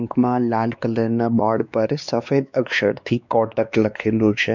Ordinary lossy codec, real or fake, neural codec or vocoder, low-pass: none; fake; codec, 16 kHz, 2 kbps, X-Codec, WavLM features, trained on Multilingual LibriSpeech; 7.2 kHz